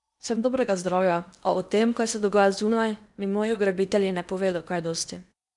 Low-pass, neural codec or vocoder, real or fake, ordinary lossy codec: 10.8 kHz; codec, 16 kHz in and 24 kHz out, 0.8 kbps, FocalCodec, streaming, 65536 codes; fake; none